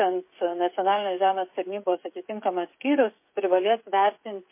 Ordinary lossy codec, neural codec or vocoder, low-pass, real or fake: MP3, 24 kbps; codec, 16 kHz, 16 kbps, FreqCodec, smaller model; 3.6 kHz; fake